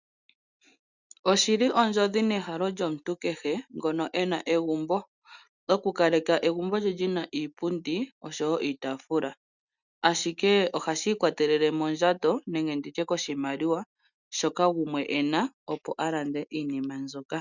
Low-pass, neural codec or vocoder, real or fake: 7.2 kHz; none; real